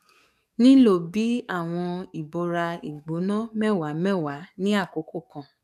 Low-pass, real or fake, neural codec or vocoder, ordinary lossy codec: 14.4 kHz; fake; codec, 44.1 kHz, 7.8 kbps, DAC; none